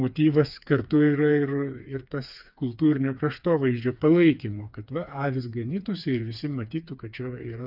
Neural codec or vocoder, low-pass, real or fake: codec, 16 kHz, 4 kbps, FreqCodec, smaller model; 5.4 kHz; fake